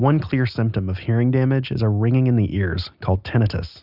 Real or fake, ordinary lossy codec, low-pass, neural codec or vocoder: real; Opus, 64 kbps; 5.4 kHz; none